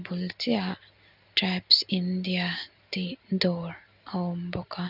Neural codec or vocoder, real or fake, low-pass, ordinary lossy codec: none; real; 5.4 kHz; none